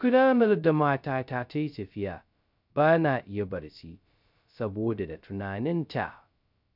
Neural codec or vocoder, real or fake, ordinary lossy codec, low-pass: codec, 16 kHz, 0.2 kbps, FocalCodec; fake; none; 5.4 kHz